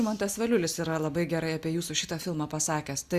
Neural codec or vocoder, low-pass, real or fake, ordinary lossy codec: none; 14.4 kHz; real; Opus, 64 kbps